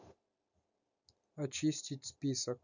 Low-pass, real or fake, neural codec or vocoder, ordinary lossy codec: 7.2 kHz; real; none; none